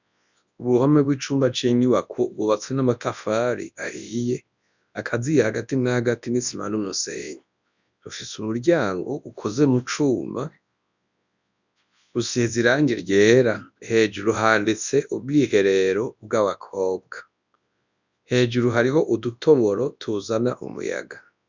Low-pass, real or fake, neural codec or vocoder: 7.2 kHz; fake; codec, 24 kHz, 0.9 kbps, WavTokenizer, large speech release